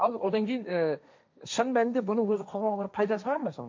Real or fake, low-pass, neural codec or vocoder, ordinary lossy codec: fake; 7.2 kHz; codec, 16 kHz, 1.1 kbps, Voila-Tokenizer; MP3, 64 kbps